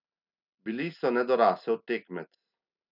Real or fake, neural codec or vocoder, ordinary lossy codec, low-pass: real; none; none; 5.4 kHz